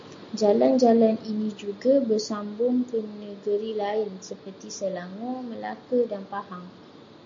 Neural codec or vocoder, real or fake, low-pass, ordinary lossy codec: none; real; 7.2 kHz; MP3, 48 kbps